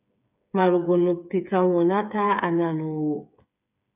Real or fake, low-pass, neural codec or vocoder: fake; 3.6 kHz; codec, 16 kHz, 8 kbps, FreqCodec, smaller model